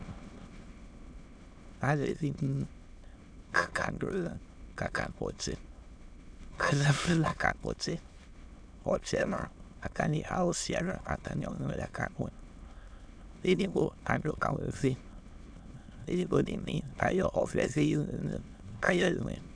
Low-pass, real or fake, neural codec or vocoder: 9.9 kHz; fake; autoencoder, 22.05 kHz, a latent of 192 numbers a frame, VITS, trained on many speakers